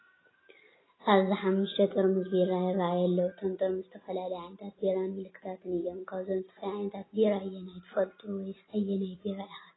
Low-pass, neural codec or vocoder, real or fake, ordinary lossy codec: 7.2 kHz; none; real; AAC, 16 kbps